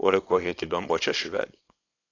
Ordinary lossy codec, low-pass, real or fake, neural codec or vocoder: AAC, 32 kbps; 7.2 kHz; fake; codec, 24 kHz, 0.9 kbps, WavTokenizer, small release